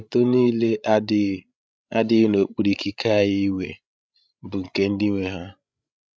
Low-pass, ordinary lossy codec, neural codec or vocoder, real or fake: none; none; codec, 16 kHz, 16 kbps, FreqCodec, larger model; fake